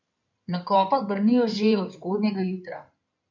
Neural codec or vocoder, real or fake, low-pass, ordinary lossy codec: codec, 16 kHz in and 24 kHz out, 2.2 kbps, FireRedTTS-2 codec; fake; 7.2 kHz; none